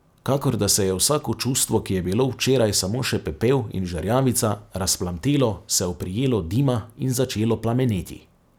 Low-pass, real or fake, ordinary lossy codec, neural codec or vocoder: none; real; none; none